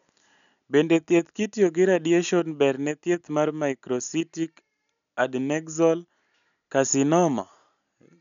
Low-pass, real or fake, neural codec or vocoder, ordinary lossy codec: 7.2 kHz; real; none; none